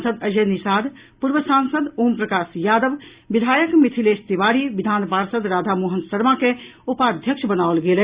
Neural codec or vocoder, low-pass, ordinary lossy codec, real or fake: none; 3.6 kHz; Opus, 64 kbps; real